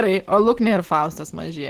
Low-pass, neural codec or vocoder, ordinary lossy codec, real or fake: 14.4 kHz; autoencoder, 48 kHz, 128 numbers a frame, DAC-VAE, trained on Japanese speech; Opus, 16 kbps; fake